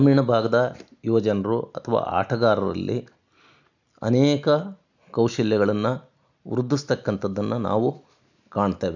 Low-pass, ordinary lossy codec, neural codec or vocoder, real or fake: 7.2 kHz; none; none; real